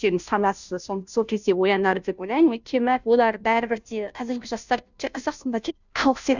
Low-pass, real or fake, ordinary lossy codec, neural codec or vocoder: 7.2 kHz; fake; none; codec, 16 kHz, 0.5 kbps, FunCodec, trained on Chinese and English, 25 frames a second